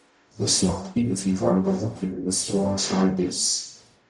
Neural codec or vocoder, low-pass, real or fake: codec, 44.1 kHz, 0.9 kbps, DAC; 10.8 kHz; fake